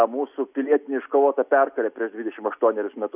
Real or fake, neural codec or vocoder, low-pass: real; none; 3.6 kHz